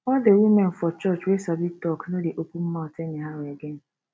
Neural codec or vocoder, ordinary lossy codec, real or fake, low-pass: none; none; real; none